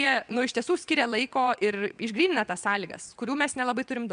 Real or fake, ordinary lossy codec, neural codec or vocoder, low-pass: fake; MP3, 96 kbps; vocoder, 22.05 kHz, 80 mel bands, WaveNeXt; 9.9 kHz